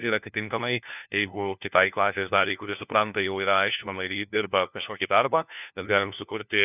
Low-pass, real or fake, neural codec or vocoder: 3.6 kHz; fake; codec, 16 kHz, 1 kbps, FunCodec, trained on LibriTTS, 50 frames a second